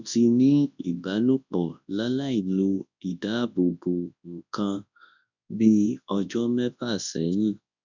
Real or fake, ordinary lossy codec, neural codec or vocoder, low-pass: fake; none; codec, 24 kHz, 0.9 kbps, WavTokenizer, large speech release; 7.2 kHz